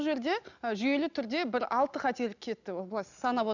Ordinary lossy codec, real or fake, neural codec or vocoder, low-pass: none; real; none; 7.2 kHz